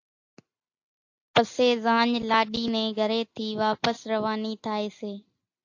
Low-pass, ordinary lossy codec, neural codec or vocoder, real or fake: 7.2 kHz; AAC, 48 kbps; none; real